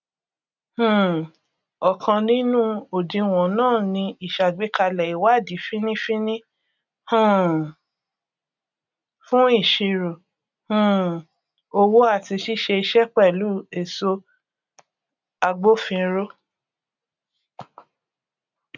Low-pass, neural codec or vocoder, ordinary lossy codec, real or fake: 7.2 kHz; none; none; real